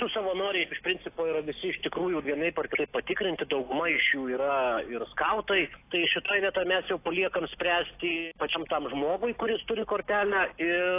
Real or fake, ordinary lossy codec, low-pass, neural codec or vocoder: real; AAC, 24 kbps; 3.6 kHz; none